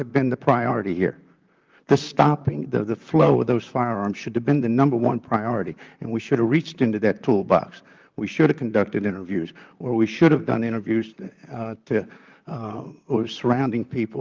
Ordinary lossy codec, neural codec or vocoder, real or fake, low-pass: Opus, 24 kbps; vocoder, 44.1 kHz, 128 mel bands, Pupu-Vocoder; fake; 7.2 kHz